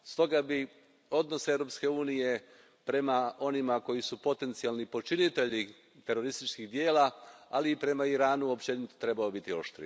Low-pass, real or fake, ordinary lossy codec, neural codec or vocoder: none; real; none; none